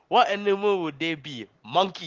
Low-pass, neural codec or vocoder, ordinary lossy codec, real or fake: 7.2 kHz; none; Opus, 16 kbps; real